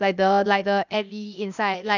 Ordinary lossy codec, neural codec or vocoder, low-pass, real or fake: none; codec, 16 kHz, 0.8 kbps, ZipCodec; 7.2 kHz; fake